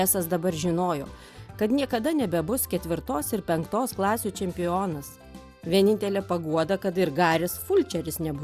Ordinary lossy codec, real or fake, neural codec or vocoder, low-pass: Opus, 64 kbps; real; none; 14.4 kHz